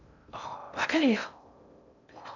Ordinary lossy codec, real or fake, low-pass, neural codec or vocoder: none; fake; 7.2 kHz; codec, 16 kHz in and 24 kHz out, 0.6 kbps, FocalCodec, streaming, 4096 codes